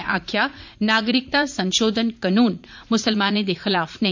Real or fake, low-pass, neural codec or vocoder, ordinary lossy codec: fake; 7.2 kHz; vocoder, 44.1 kHz, 80 mel bands, Vocos; MP3, 64 kbps